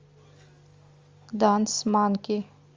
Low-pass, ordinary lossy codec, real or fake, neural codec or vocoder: 7.2 kHz; Opus, 32 kbps; real; none